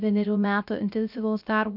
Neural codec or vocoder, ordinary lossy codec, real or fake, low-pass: codec, 16 kHz, 0.3 kbps, FocalCodec; AAC, 48 kbps; fake; 5.4 kHz